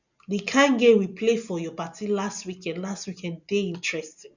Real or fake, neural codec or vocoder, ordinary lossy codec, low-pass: real; none; none; 7.2 kHz